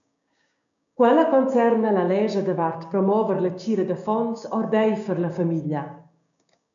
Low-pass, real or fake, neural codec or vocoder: 7.2 kHz; fake; codec, 16 kHz, 6 kbps, DAC